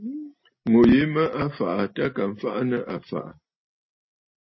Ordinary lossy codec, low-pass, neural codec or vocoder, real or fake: MP3, 24 kbps; 7.2 kHz; none; real